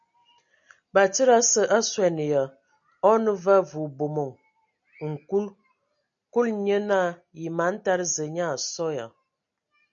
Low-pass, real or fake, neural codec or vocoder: 7.2 kHz; real; none